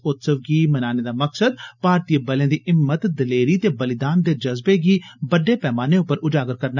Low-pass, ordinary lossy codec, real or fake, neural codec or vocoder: 7.2 kHz; none; real; none